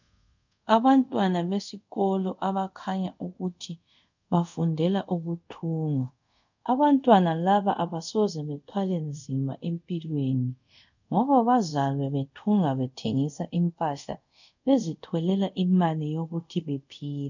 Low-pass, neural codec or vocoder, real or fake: 7.2 kHz; codec, 24 kHz, 0.5 kbps, DualCodec; fake